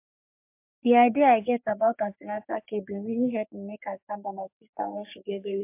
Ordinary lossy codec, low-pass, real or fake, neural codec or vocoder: MP3, 32 kbps; 3.6 kHz; fake; codec, 44.1 kHz, 3.4 kbps, Pupu-Codec